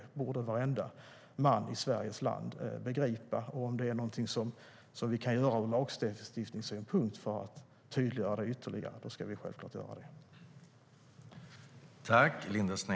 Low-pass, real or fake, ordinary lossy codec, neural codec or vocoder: none; real; none; none